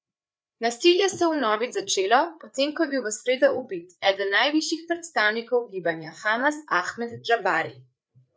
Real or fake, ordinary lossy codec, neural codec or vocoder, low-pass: fake; none; codec, 16 kHz, 4 kbps, FreqCodec, larger model; none